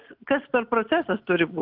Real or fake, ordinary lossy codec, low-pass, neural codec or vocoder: real; Opus, 32 kbps; 5.4 kHz; none